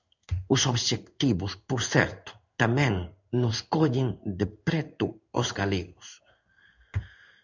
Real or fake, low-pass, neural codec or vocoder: fake; 7.2 kHz; codec, 16 kHz in and 24 kHz out, 1 kbps, XY-Tokenizer